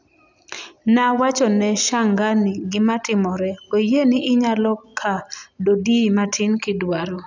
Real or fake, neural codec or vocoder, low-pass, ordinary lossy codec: real; none; 7.2 kHz; none